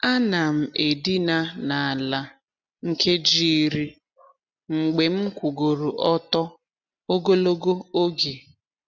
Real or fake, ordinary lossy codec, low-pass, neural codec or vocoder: real; none; 7.2 kHz; none